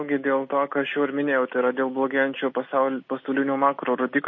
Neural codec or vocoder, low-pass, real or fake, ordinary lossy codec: none; 7.2 kHz; real; MP3, 32 kbps